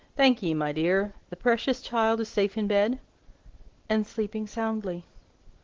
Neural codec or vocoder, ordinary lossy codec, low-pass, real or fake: none; Opus, 16 kbps; 7.2 kHz; real